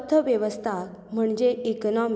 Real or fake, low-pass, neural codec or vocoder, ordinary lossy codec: real; none; none; none